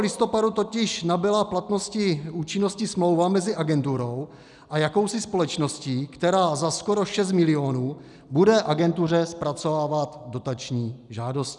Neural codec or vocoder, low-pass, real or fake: none; 10.8 kHz; real